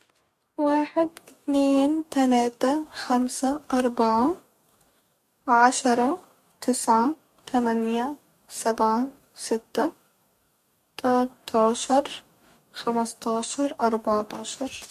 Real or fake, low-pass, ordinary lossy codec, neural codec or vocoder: fake; 14.4 kHz; MP3, 64 kbps; codec, 44.1 kHz, 2.6 kbps, DAC